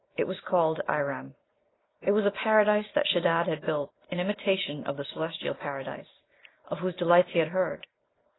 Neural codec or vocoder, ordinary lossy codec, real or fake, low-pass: none; AAC, 16 kbps; real; 7.2 kHz